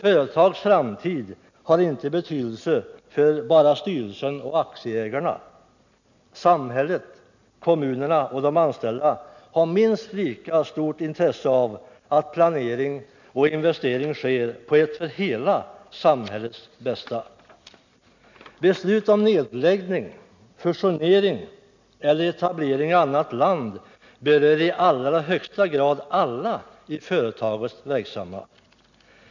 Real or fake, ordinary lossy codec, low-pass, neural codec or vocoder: real; none; 7.2 kHz; none